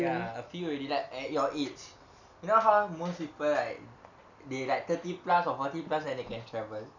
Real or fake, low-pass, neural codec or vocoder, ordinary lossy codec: real; 7.2 kHz; none; none